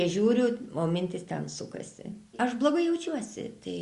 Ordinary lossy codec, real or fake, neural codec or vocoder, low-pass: Opus, 64 kbps; real; none; 10.8 kHz